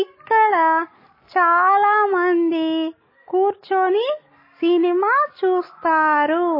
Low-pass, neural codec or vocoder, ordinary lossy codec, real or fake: 5.4 kHz; none; MP3, 24 kbps; real